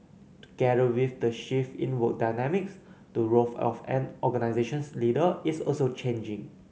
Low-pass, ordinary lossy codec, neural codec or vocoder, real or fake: none; none; none; real